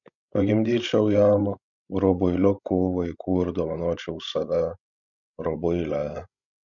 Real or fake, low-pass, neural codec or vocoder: fake; 7.2 kHz; codec, 16 kHz, 16 kbps, FreqCodec, larger model